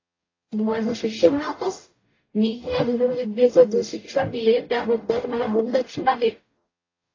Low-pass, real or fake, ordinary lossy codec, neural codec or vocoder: 7.2 kHz; fake; AAC, 32 kbps; codec, 44.1 kHz, 0.9 kbps, DAC